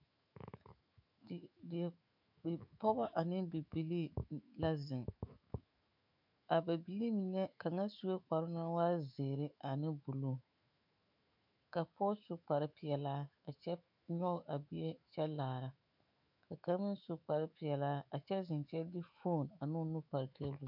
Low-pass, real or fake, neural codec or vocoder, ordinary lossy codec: 5.4 kHz; fake; autoencoder, 48 kHz, 128 numbers a frame, DAC-VAE, trained on Japanese speech; MP3, 48 kbps